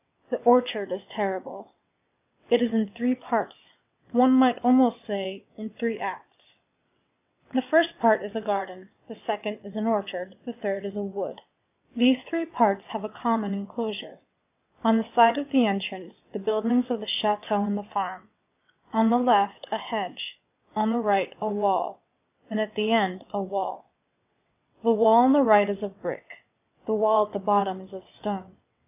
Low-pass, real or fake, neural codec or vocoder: 3.6 kHz; fake; vocoder, 22.05 kHz, 80 mel bands, WaveNeXt